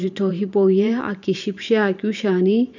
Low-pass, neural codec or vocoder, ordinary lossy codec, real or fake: 7.2 kHz; vocoder, 44.1 kHz, 128 mel bands every 512 samples, BigVGAN v2; none; fake